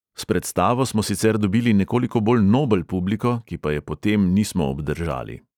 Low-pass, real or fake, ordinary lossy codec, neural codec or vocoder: 19.8 kHz; real; none; none